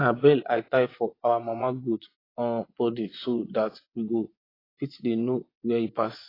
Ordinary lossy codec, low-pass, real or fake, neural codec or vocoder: AAC, 24 kbps; 5.4 kHz; fake; vocoder, 24 kHz, 100 mel bands, Vocos